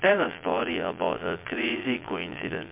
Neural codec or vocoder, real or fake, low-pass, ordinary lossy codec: vocoder, 22.05 kHz, 80 mel bands, Vocos; fake; 3.6 kHz; MP3, 32 kbps